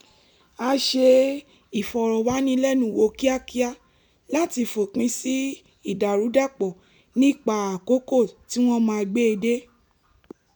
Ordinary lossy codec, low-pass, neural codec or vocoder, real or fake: none; none; none; real